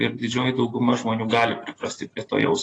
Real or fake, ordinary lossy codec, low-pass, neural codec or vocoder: real; AAC, 32 kbps; 9.9 kHz; none